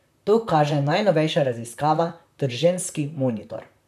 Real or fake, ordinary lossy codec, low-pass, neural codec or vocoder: fake; none; 14.4 kHz; vocoder, 44.1 kHz, 128 mel bands, Pupu-Vocoder